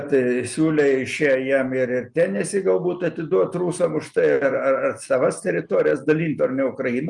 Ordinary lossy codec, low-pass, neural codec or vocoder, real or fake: Opus, 32 kbps; 10.8 kHz; none; real